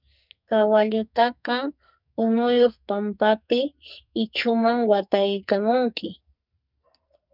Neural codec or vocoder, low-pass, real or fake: codec, 44.1 kHz, 2.6 kbps, SNAC; 5.4 kHz; fake